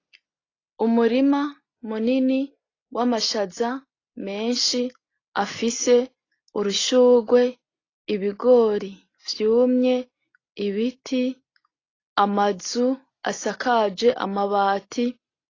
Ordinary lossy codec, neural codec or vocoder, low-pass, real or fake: AAC, 32 kbps; none; 7.2 kHz; real